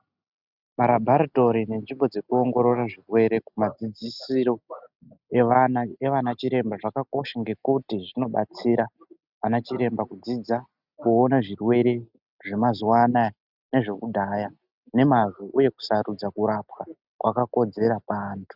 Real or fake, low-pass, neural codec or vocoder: real; 5.4 kHz; none